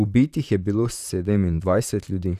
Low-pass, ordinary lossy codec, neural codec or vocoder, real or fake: 14.4 kHz; none; vocoder, 44.1 kHz, 128 mel bands, Pupu-Vocoder; fake